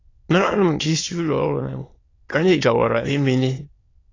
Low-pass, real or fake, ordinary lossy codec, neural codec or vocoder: 7.2 kHz; fake; AAC, 32 kbps; autoencoder, 22.05 kHz, a latent of 192 numbers a frame, VITS, trained on many speakers